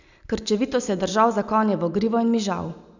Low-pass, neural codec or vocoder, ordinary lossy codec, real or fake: 7.2 kHz; none; none; real